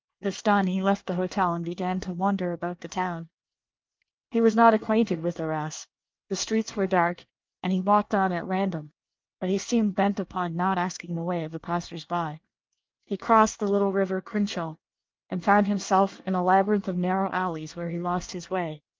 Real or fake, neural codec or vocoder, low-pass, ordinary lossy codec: fake; codec, 24 kHz, 1 kbps, SNAC; 7.2 kHz; Opus, 24 kbps